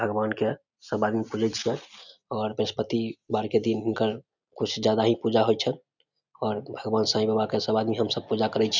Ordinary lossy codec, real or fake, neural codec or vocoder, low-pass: none; real; none; 7.2 kHz